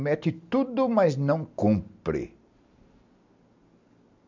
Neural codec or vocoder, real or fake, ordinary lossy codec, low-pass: none; real; MP3, 64 kbps; 7.2 kHz